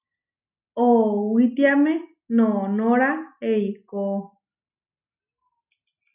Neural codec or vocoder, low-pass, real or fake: none; 3.6 kHz; real